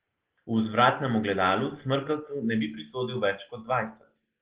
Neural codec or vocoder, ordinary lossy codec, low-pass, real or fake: none; Opus, 16 kbps; 3.6 kHz; real